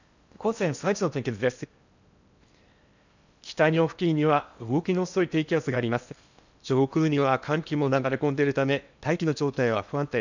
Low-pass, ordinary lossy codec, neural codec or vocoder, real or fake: 7.2 kHz; none; codec, 16 kHz in and 24 kHz out, 0.8 kbps, FocalCodec, streaming, 65536 codes; fake